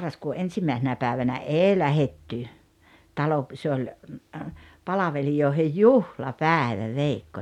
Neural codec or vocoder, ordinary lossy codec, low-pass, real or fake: none; MP3, 96 kbps; 19.8 kHz; real